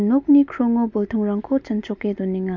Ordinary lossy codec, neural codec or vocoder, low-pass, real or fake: MP3, 64 kbps; none; 7.2 kHz; real